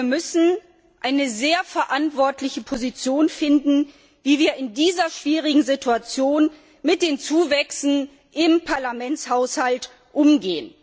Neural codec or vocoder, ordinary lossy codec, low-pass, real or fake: none; none; none; real